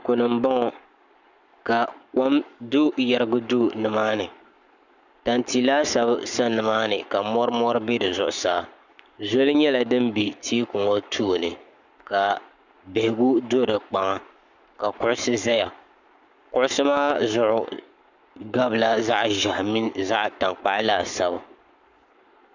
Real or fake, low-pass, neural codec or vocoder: fake; 7.2 kHz; codec, 44.1 kHz, 7.8 kbps, Pupu-Codec